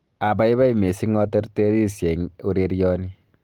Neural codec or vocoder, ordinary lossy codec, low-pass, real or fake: none; Opus, 32 kbps; 19.8 kHz; real